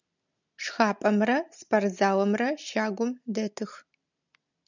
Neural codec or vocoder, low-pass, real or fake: none; 7.2 kHz; real